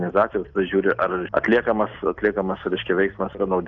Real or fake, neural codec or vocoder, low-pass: real; none; 7.2 kHz